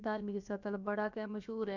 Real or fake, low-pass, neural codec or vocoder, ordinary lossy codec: fake; 7.2 kHz; codec, 16 kHz, about 1 kbps, DyCAST, with the encoder's durations; none